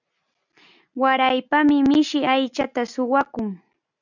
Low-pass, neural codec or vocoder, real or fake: 7.2 kHz; none; real